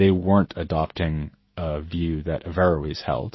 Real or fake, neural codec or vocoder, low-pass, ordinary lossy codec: fake; autoencoder, 48 kHz, 128 numbers a frame, DAC-VAE, trained on Japanese speech; 7.2 kHz; MP3, 24 kbps